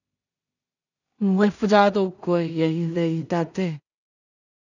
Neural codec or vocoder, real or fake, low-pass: codec, 16 kHz in and 24 kHz out, 0.4 kbps, LongCat-Audio-Codec, two codebook decoder; fake; 7.2 kHz